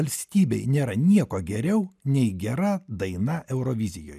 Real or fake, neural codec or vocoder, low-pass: real; none; 14.4 kHz